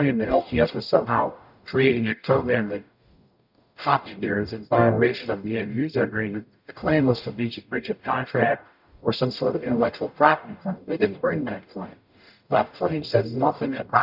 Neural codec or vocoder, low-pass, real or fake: codec, 44.1 kHz, 0.9 kbps, DAC; 5.4 kHz; fake